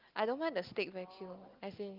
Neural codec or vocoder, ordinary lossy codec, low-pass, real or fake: none; Opus, 32 kbps; 5.4 kHz; real